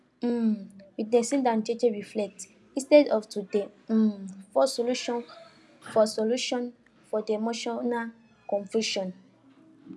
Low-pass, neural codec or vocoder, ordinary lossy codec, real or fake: none; none; none; real